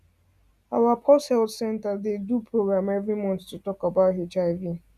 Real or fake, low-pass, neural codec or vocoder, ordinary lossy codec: real; 14.4 kHz; none; Opus, 64 kbps